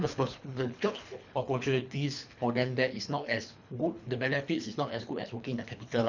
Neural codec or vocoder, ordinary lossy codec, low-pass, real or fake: codec, 24 kHz, 3 kbps, HILCodec; none; 7.2 kHz; fake